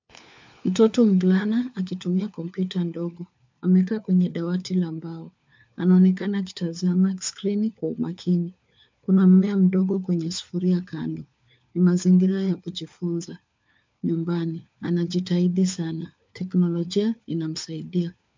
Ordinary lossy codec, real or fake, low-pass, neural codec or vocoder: MP3, 64 kbps; fake; 7.2 kHz; codec, 16 kHz, 4 kbps, FunCodec, trained on LibriTTS, 50 frames a second